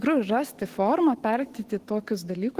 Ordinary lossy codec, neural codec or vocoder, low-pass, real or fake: Opus, 16 kbps; autoencoder, 48 kHz, 128 numbers a frame, DAC-VAE, trained on Japanese speech; 14.4 kHz; fake